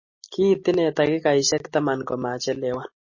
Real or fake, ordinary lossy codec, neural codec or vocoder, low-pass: real; MP3, 32 kbps; none; 7.2 kHz